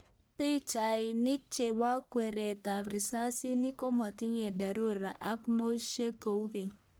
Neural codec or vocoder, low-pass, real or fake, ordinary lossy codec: codec, 44.1 kHz, 1.7 kbps, Pupu-Codec; none; fake; none